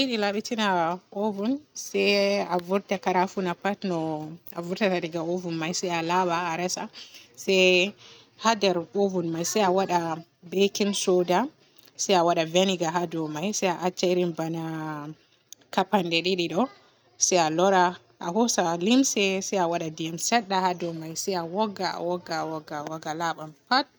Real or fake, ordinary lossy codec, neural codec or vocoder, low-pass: real; none; none; none